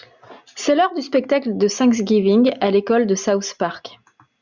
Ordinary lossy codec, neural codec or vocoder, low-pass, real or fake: Opus, 64 kbps; none; 7.2 kHz; real